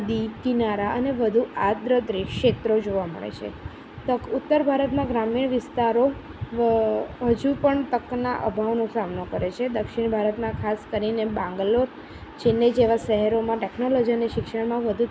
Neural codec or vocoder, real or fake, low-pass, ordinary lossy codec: none; real; none; none